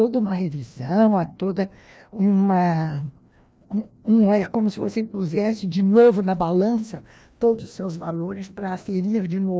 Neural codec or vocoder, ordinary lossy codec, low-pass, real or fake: codec, 16 kHz, 1 kbps, FreqCodec, larger model; none; none; fake